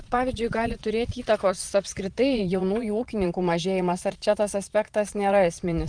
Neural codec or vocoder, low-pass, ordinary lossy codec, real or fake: vocoder, 22.05 kHz, 80 mel bands, Vocos; 9.9 kHz; Opus, 32 kbps; fake